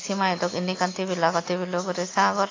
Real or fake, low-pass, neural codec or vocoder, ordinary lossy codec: real; 7.2 kHz; none; AAC, 32 kbps